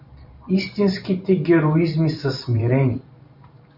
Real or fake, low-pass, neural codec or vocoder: fake; 5.4 kHz; vocoder, 44.1 kHz, 128 mel bands every 512 samples, BigVGAN v2